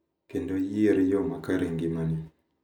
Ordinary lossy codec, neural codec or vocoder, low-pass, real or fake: none; none; 19.8 kHz; real